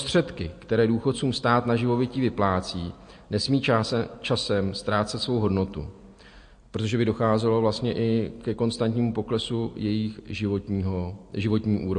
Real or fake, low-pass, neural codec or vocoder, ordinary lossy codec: real; 9.9 kHz; none; MP3, 48 kbps